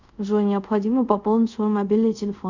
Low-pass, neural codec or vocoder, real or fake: 7.2 kHz; codec, 24 kHz, 0.5 kbps, DualCodec; fake